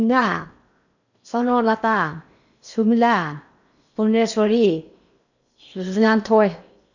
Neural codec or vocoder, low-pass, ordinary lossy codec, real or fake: codec, 16 kHz in and 24 kHz out, 0.8 kbps, FocalCodec, streaming, 65536 codes; 7.2 kHz; none; fake